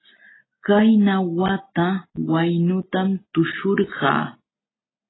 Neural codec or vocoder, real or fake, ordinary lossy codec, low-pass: none; real; AAC, 16 kbps; 7.2 kHz